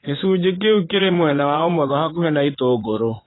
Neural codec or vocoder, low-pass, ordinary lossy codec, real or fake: vocoder, 22.05 kHz, 80 mel bands, Vocos; 7.2 kHz; AAC, 16 kbps; fake